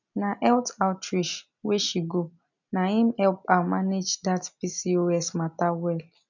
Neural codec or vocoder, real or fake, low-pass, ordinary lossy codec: none; real; 7.2 kHz; none